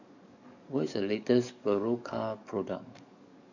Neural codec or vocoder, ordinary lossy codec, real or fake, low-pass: codec, 44.1 kHz, 7.8 kbps, DAC; none; fake; 7.2 kHz